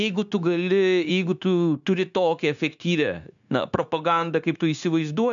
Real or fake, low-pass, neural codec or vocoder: fake; 7.2 kHz; codec, 16 kHz, 0.9 kbps, LongCat-Audio-Codec